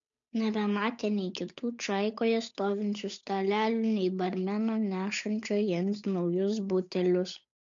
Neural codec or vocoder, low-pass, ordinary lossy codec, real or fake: codec, 16 kHz, 8 kbps, FunCodec, trained on Chinese and English, 25 frames a second; 7.2 kHz; MP3, 64 kbps; fake